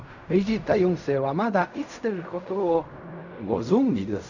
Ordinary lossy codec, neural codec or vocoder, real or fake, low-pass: none; codec, 16 kHz in and 24 kHz out, 0.4 kbps, LongCat-Audio-Codec, fine tuned four codebook decoder; fake; 7.2 kHz